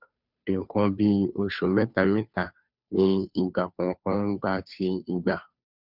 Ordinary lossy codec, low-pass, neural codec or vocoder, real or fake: none; 5.4 kHz; codec, 16 kHz, 2 kbps, FunCodec, trained on Chinese and English, 25 frames a second; fake